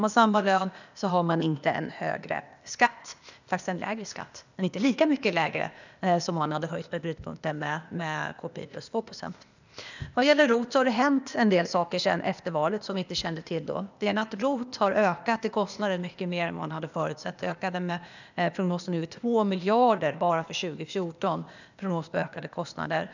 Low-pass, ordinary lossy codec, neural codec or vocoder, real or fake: 7.2 kHz; none; codec, 16 kHz, 0.8 kbps, ZipCodec; fake